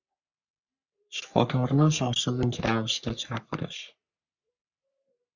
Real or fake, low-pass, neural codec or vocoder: fake; 7.2 kHz; codec, 44.1 kHz, 3.4 kbps, Pupu-Codec